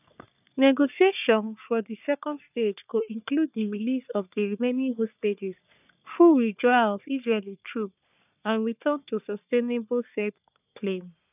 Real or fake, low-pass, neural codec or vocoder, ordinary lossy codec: fake; 3.6 kHz; codec, 44.1 kHz, 3.4 kbps, Pupu-Codec; none